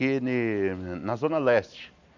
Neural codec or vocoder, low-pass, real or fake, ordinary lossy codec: none; 7.2 kHz; real; none